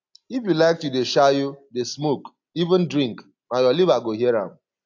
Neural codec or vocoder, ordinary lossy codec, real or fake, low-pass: none; AAC, 48 kbps; real; 7.2 kHz